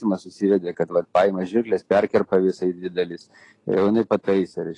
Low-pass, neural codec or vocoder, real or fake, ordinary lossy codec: 10.8 kHz; none; real; AAC, 32 kbps